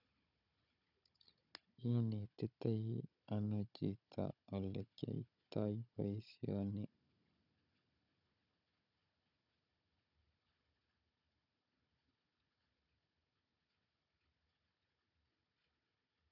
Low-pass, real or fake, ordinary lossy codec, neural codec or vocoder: 5.4 kHz; fake; none; codec, 16 kHz, 16 kbps, FunCodec, trained on Chinese and English, 50 frames a second